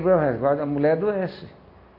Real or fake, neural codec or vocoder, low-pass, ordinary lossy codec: real; none; 5.4 kHz; MP3, 32 kbps